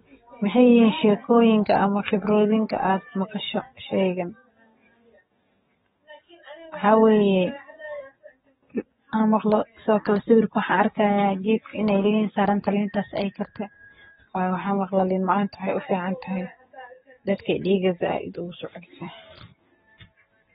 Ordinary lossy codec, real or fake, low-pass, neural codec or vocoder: AAC, 16 kbps; fake; 19.8 kHz; codec, 44.1 kHz, 7.8 kbps, DAC